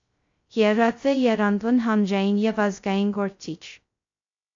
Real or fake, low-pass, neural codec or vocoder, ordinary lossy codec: fake; 7.2 kHz; codec, 16 kHz, 0.2 kbps, FocalCodec; AAC, 48 kbps